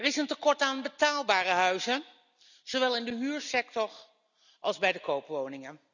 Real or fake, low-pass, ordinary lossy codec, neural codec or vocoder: real; 7.2 kHz; none; none